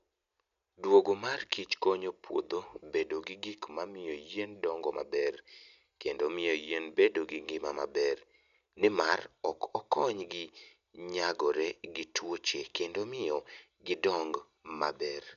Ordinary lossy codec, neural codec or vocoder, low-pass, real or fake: none; none; 7.2 kHz; real